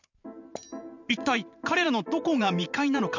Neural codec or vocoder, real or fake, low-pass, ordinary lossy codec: vocoder, 44.1 kHz, 128 mel bands every 512 samples, BigVGAN v2; fake; 7.2 kHz; none